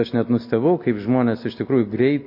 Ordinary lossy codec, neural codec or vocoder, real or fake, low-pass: MP3, 32 kbps; none; real; 5.4 kHz